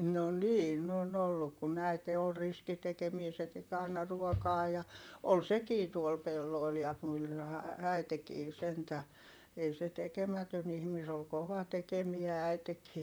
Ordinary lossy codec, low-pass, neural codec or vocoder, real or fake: none; none; vocoder, 44.1 kHz, 128 mel bands, Pupu-Vocoder; fake